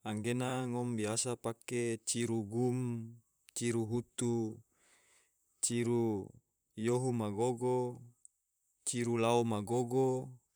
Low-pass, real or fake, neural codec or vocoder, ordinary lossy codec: none; fake; vocoder, 44.1 kHz, 128 mel bands, Pupu-Vocoder; none